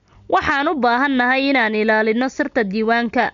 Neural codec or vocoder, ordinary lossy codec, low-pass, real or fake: none; none; 7.2 kHz; real